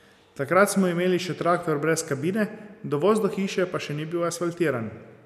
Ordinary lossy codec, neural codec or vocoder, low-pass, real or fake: none; none; 14.4 kHz; real